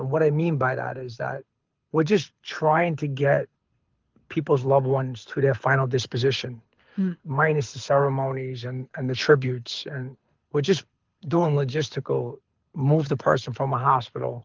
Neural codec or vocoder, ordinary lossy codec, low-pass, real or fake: codec, 24 kHz, 6 kbps, HILCodec; Opus, 32 kbps; 7.2 kHz; fake